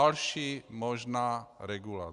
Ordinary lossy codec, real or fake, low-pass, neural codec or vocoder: Opus, 64 kbps; real; 10.8 kHz; none